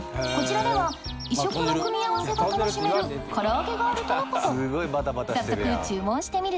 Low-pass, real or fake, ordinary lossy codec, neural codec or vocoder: none; real; none; none